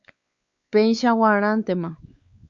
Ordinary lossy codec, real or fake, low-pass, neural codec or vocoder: Opus, 64 kbps; fake; 7.2 kHz; codec, 16 kHz, 4 kbps, X-Codec, WavLM features, trained on Multilingual LibriSpeech